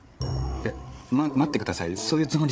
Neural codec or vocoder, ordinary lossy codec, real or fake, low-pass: codec, 16 kHz, 8 kbps, FreqCodec, larger model; none; fake; none